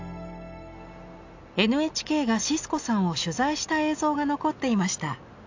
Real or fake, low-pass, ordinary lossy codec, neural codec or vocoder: real; 7.2 kHz; AAC, 48 kbps; none